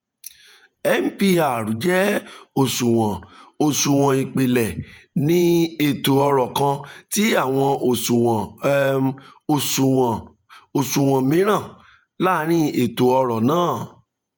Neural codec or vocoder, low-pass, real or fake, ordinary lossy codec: vocoder, 48 kHz, 128 mel bands, Vocos; none; fake; none